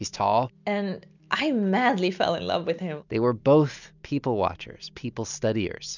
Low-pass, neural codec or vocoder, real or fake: 7.2 kHz; none; real